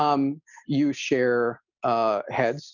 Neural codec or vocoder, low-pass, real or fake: none; 7.2 kHz; real